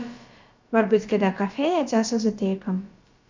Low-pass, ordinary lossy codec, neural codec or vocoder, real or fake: 7.2 kHz; MP3, 64 kbps; codec, 16 kHz, about 1 kbps, DyCAST, with the encoder's durations; fake